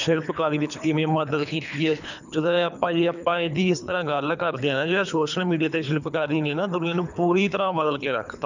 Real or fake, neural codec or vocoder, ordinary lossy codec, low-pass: fake; codec, 24 kHz, 3 kbps, HILCodec; none; 7.2 kHz